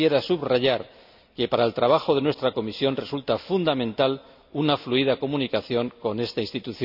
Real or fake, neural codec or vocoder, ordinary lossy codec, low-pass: real; none; none; 5.4 kHz